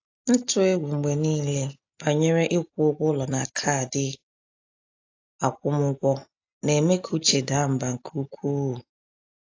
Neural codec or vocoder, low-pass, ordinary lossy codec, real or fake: none; 7.2 kHz; AAC, 48 kbps; real